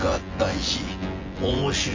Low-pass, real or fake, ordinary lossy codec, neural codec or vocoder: 7.2 kHz; fake; MP3, 48 kbps; vocoder, 24 kHz, 100 mel bands, Vocos